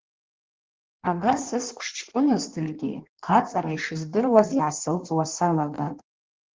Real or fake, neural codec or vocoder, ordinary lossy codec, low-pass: fake; codec, 16 kHz in and 24 kHz out, 1.1 kbps, FireRedTTS-2 codec; Opus, 16 kbps; 7.2 kHz